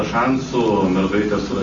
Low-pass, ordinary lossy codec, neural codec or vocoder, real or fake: 7.2 kHz; Opus, 32 kbps; none; real